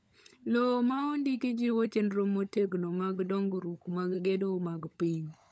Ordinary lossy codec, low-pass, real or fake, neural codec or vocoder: none; none; fake; codec, 16 kHz, 16 kbps, FunCodec, trained on LibriTTS, 50 frames a second